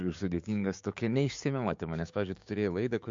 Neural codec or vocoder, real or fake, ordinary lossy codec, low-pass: codec, 16 kHz, 6 kbps, DAC; fake; MP3, 48 kbps; 7.2 kHz